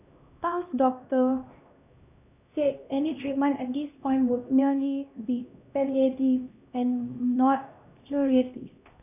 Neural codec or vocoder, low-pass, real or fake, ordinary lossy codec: codec, 16 kHz, 1 kbps, X-Codec, HuBERT features, trained on LibriSpeech; 3.6 kHz; fake; none